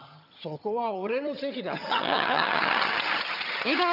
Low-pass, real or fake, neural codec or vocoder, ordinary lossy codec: 5.4 kHz; fake; vocoder, 22.05 kHz, 80 mel bands, HiFi-GAN; none